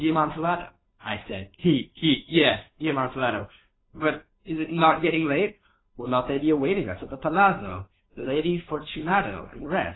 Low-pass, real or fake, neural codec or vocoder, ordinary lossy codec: 7.2 kHz; fake; codec, 16 kHz, 2 kbps, FunCodec, trained on Chinese and English, 25 frames a second; AAC, 16 kbps